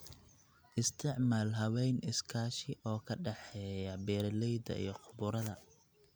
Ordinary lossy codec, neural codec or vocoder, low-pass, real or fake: none; none; none; real